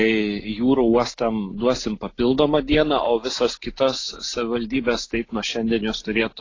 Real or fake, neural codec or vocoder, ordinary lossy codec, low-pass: real; none; AAC, 32 kbps; 7.2 kHz